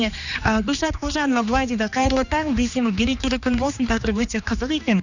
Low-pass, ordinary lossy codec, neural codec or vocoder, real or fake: 7.2 kHz; none; codec, 16 kHz, 2 kbps, X-Codec, HuBERT features, trained on general audio; fake